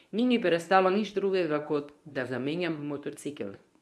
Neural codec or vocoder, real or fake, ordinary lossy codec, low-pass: codec, 24 kHz, 0.9 kbps, WavTokenizer, medium speech release version 1; fake; none; none